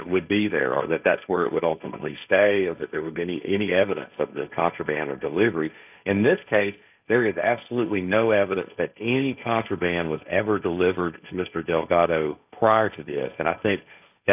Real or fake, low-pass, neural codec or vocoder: fake; 3.6 kHz; codec, 16 kHz, 1.1 kbps, Voila-Tokenizer